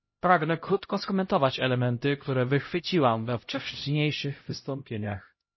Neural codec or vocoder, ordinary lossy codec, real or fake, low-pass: codec, 16 kHz, 0.5 kbps, X-Codec, HuBERT features, trained on LibriSpeech; MP3, 24 kbps; fake; 7.2 kHz